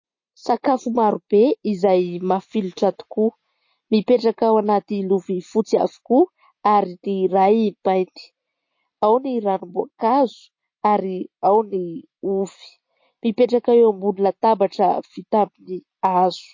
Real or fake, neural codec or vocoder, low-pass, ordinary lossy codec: real; none; 7.2 kHz; MP3, 32 kbps